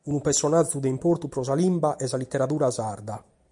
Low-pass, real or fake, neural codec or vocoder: 10.8 kHz; real; none